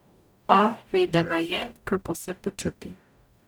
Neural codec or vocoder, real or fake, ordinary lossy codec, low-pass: codec, 44.1 kHz, 0.9 kbps, DAC; fake; none; none